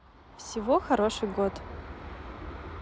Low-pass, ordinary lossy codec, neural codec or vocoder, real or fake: none; none; none; real